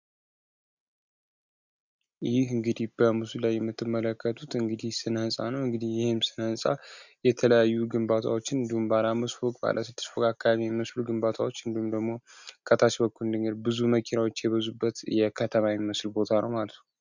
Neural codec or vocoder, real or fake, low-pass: none; real; 7.2 kHz